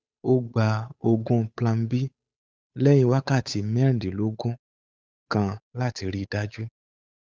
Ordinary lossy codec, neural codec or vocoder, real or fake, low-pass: none; codec, 16 kHz, 8 kbps, FunCodec, trained on Chinese and English, 25 frames a second; fake; none